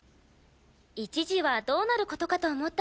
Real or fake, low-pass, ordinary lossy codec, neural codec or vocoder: real; none; none; none